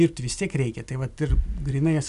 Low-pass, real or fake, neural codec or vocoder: 10.8 kHz; real; none